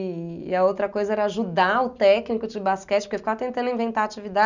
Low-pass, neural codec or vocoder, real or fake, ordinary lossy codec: 7.2 kHz; none; real; none